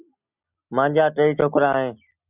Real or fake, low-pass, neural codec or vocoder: real; 3.6 kHz; none